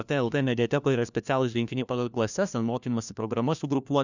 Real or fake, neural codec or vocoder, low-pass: fake; codec, 16 kHz, 1 kbps, FunCodec, trained on LibriTTS, 50 frames a second; 7.2 kHz